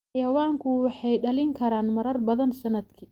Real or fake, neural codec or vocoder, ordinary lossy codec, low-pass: real; none; Opus, 32 kbps; 19.8 kHz